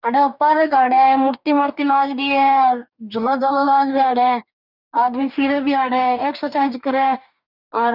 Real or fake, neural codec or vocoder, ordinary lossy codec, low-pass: fake; codec, 44.1 kHz, 2.6 kbps, DAC; none; 5.4 kHz